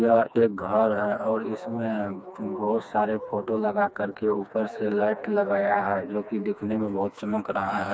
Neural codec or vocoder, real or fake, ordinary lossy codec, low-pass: codec, 16 kHz, 2 kbps, FreqCodec, smaller model; fake; none; none